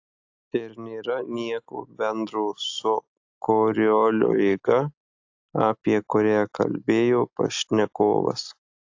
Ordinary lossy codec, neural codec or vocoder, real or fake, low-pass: AAC, 48 kbps; none; real; 7.2 kHz